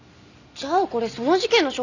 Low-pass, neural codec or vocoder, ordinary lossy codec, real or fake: 7.2 kHz; none; none; real